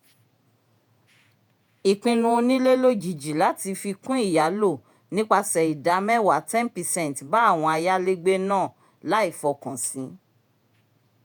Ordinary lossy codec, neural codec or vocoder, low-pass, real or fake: none; vocoder, 48 kHz, 128 mel bands, Vocos; none; fake